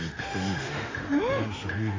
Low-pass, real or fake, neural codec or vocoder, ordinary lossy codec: 7.2 kHz; fake; autoencoder, 48 kHz, 32 numbers a frame, DAC-VAE, trained on Japanese speech; AAC, 32 kbps